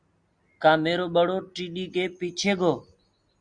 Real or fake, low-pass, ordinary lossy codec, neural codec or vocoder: real; 9.9 kHz; Opus, 64 kbps; none